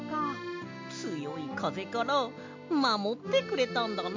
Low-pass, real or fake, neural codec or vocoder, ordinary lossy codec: 7.2 kHz; real; none; MP3, 64 kbps